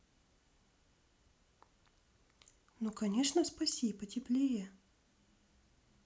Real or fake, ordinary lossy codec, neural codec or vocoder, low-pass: real; none; none; none